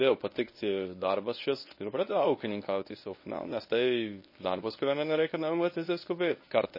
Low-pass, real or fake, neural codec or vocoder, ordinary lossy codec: 5.4 kHz; fake; codec, 24 kHz, 0.9 kbps, WavTokenizer, medium speech release version 1; MP3, 24 kbps